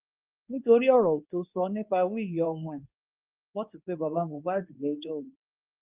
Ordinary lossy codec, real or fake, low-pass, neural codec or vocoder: Opus, 24 kbps; fake; 3.6 kHz; codec, 24 kHz, 0.9 kbps, WavTokenizer, medium speech release version 2